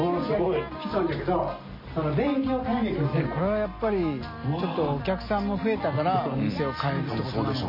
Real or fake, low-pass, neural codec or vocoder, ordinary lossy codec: real; 5.4 kHz; none; MP3, 24 kbps